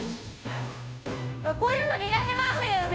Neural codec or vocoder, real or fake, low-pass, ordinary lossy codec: codec, 16 kHz, 0.5 kbps, FunCodec, trained on Chinese and English, 25 frames a second; fake; none; none